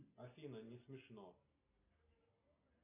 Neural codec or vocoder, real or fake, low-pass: none; real; 3.6 kHz